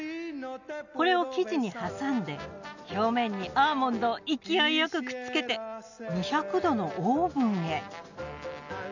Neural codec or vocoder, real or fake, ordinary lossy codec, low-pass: none; real; none; 7.2 kHz